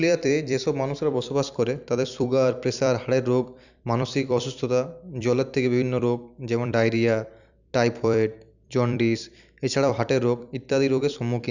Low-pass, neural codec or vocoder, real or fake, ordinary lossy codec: 7.2 kHz; vocoder, 44.1 kHz, 128 mel bands every 256 samples, BigVGAN v2; fake; none